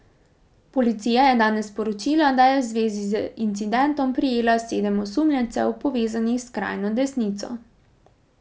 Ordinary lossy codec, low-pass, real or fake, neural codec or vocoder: none; none; real; none